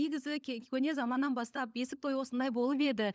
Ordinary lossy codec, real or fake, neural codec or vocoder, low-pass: none; fake; codec, 16 kHz, 16 kbps, FunCodec, trained on LibriTTS, 50 frames a second; none